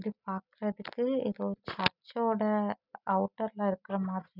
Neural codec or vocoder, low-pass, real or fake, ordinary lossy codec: none; 5.4 kHz; real; none